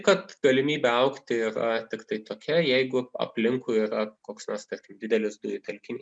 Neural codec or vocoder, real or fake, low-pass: none; real; 9.9 kHz